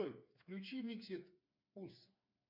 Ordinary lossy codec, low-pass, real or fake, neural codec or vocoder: MP3, 32 kbps; 5.4 kHz; fake; codec, 16 kHz, 4 kbps, FreqCodec, smaller model